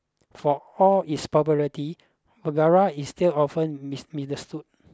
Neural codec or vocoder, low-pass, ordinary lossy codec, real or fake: none; none; none; real